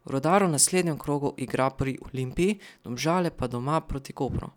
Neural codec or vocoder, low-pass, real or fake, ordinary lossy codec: none; 19.8 kHz; real; none